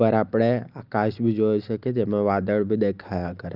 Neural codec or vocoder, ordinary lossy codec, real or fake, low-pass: none; Opus, 32 kbps; real; 5.4 kHz